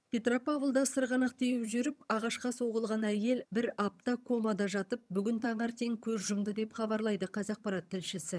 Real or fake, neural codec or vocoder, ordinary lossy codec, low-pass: fake; vocoder, 22.05 kHz, 80 mel bands, HiFi-GAN; none; none